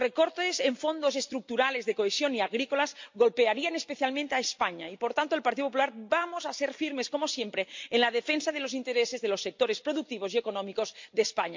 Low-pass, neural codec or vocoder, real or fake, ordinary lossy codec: 7.2 kHz; none; real; MP3, 48 kbps